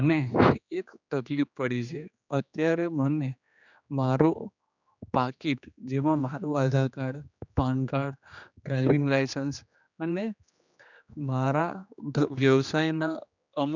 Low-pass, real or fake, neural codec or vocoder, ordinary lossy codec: 7.2 kHz; fake; codec, 16 kHz, 2 kbps, X-Codec, HuBERT features, trained on general audio; none